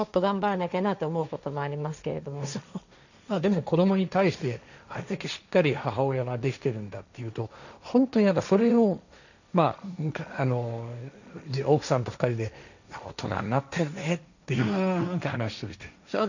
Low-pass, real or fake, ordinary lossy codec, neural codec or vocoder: 7.2 kHz; fake; none; codec, 16 kHz, 1.1 kbps, Voila-Tokenizer